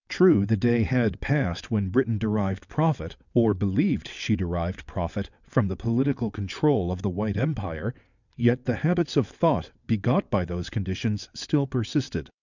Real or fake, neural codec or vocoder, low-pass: fake; vocoder, 22.05 kHz, 80 mel bands, WaveNeXt; 7.2 kHz